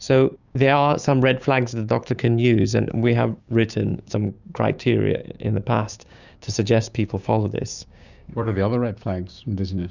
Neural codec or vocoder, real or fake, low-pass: codec, 16 kHz, 6 kbps, DAC; fake; 7.2 kHz